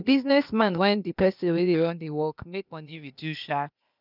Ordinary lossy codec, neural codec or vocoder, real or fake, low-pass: none; codec, 16 kHz, 0.8 kbps, ZipCodec; fake; 5.4 kHz